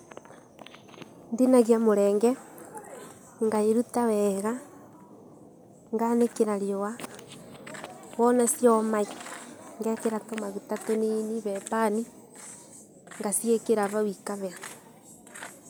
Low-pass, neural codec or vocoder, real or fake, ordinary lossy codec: none; none; real; none